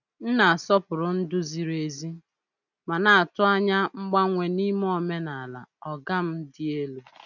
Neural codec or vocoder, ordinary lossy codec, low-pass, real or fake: none; none; 7.2 kHz; real